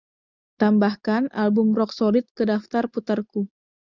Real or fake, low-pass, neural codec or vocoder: real; 7.2 kHz; none